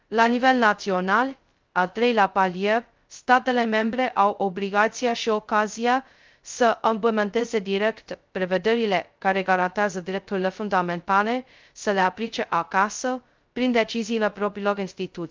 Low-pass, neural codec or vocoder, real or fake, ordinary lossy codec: 7.2 kHz; codec, 16 kHz, 0.2 kbps, FocalCodec; fake; Opus, 32 kbps